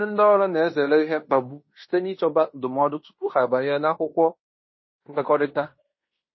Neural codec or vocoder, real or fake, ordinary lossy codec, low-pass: codec, 16 kHz in and 24 kHz out, 0.9 kbps, LongCat-Audio-Codec, fine tuned four codebook decoder; fake; MP3, 24 kbps; 7.2 kHz